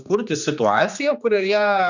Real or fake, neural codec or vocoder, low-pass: fake; codec, 16 kHz, 2 kbps, X-Codec, HuBERT features, trained on general audio; 7.2 kHz